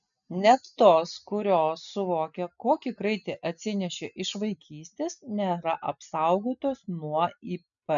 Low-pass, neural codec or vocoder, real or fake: 7.2 kHz; none; real